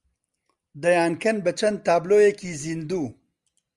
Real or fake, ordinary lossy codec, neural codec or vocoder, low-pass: real; Opus, 32 kbps; none; 10.8 kHz